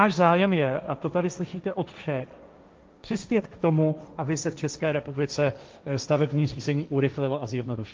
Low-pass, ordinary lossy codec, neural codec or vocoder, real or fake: 7.2 kHz; Opus, 24 kbps; codec, 16 kHz, 1.1 kbps, Voila-Tokenizer; fake